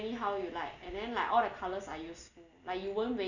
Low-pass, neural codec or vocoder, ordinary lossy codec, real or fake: 7.2 kHz; none; none; real